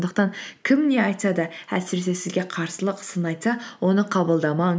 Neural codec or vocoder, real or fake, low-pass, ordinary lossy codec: none; real; none; none